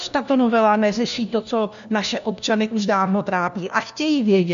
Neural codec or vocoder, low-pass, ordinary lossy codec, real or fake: codec, 16 kHz, 1 kbps, FunCodec, trained on LibriTTS, 50 frames a second; 7.2 kHz; MP3, 96 kbps; fake